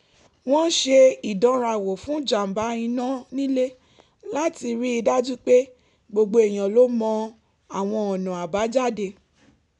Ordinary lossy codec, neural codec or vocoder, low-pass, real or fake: none; none; 10.8 kHz; real